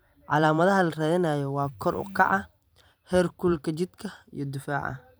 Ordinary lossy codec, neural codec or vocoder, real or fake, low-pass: none; vocoder, 44.1 kHz, 128 mel bands every 512 samples, BigVGAN v2; fake; none